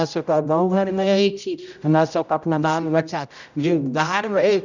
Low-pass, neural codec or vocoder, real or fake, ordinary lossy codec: 7.2 kHz; codec, 16 kHz, 0.5 kbps, X-Codec, HuBERT features, trained on general audio; fake; none